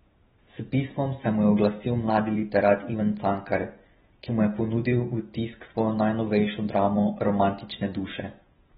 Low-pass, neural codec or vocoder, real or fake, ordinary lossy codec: 7.2 kHz; none; real; AAC, 16 kbps